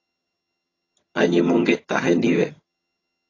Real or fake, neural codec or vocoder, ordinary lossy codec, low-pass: fake; vocoder, 22.05 kHz, 80 mel bands, HiFi-GAN; AAC, 32 kbps; 7.2 kHz